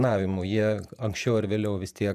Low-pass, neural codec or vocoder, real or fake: 14.4 kHz; vocoder, 48 kHz, 128 mel bands, Vocos; fake